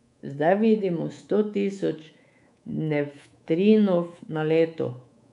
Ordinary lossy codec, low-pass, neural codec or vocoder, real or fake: none; 10.8 kHz; codec, 24 kHz, 3.1 kbps, DualCodec; fake